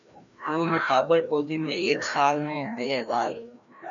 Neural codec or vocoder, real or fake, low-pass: codec, 16 kHz, 1 kbps, FreqCodec, larger model; fake; 7.2 kHz